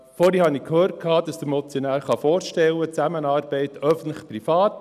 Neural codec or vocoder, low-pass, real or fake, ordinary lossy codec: none; 14.4 kHz; real; none